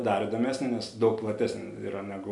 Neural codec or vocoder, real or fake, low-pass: none; real; 10.8 kHz